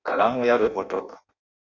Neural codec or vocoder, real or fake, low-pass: codec, 16 kHz in and 24 kHz out, 0.6 kbps, FireRedTTS-2 codec; fake; 7.2 kHz